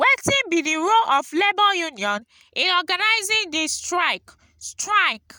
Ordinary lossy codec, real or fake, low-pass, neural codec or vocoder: none; real; none; none